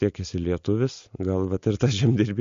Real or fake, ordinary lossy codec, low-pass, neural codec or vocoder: real; MP3, 48 kbps; 7.2 kHz; none